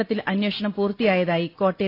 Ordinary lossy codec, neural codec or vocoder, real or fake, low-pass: AAC, 32 kbps; none; real; 5.4 kHz